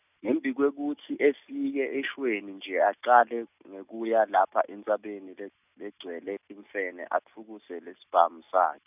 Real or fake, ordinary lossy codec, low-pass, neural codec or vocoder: real; none; 3.6 kHz; none